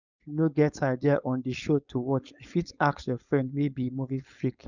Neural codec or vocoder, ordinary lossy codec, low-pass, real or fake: codec, 16 kHz, 4.8 kbps, FACodec; none; 7.2 kHz; fake